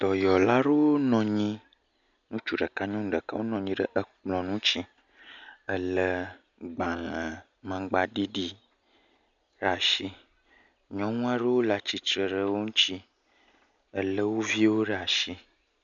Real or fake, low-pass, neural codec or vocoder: real; 7.2 kHz; none